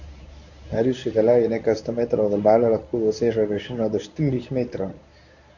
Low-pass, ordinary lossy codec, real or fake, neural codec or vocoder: 7.2 kHz; none; fake; codec, 24 kHz, 0.9 kbps, WavTokenizer, medium speech release version 1